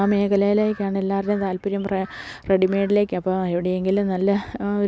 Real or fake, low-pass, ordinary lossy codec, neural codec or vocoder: real; none; none; none